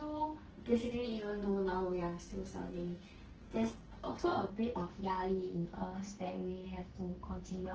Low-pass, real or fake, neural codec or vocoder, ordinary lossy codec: 7.2 kHz; fake; codec, 44.1 kHz, 2.6 kbps, SNAC; Opus, 16 kbps